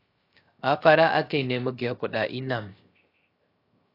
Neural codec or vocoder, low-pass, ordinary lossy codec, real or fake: codec, 16 kHz, 0.3 kbps, FocalCodec; 5.4 kHz; AAC, 32 kbps; fake